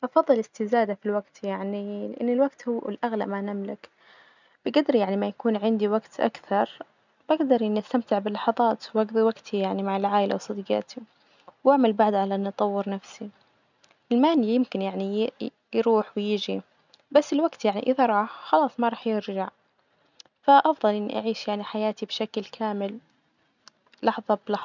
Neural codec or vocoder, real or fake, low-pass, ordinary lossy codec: none; real; 7.2 kHz; none